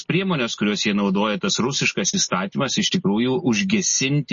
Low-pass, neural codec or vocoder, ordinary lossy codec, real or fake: 7.2 kHz; none; MP3, 32 kbps; real